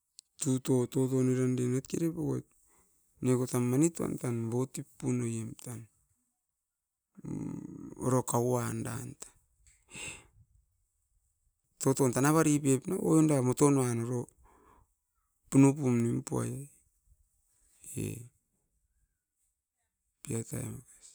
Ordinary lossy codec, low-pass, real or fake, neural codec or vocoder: none; none; real; none